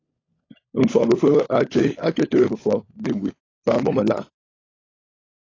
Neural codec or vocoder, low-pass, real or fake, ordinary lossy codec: codec, 16 kHz, 16 kbps, FunCodec, trained on LibriTTS, 50 frames a second; 7.2 kHz; fake; AAC, 32 kbps